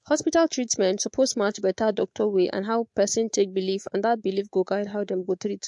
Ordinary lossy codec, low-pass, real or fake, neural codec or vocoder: MP3, 48 kbps; 10.8 kHz; fake; codec, 24 kHz, 3.1 kbps, DualCodec